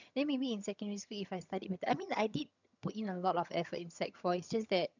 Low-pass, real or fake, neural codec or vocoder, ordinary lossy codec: 7.2 kHz; fake; vocoder, 22.05 kHz, 80 mel bands, HiFi-GAN; none